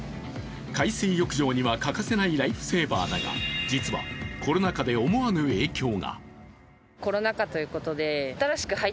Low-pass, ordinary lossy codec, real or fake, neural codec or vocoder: none; none; real; none